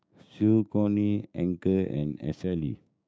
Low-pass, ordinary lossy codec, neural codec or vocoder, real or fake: none; none; codec, 16 kHz, 6 kbps, DAC; fake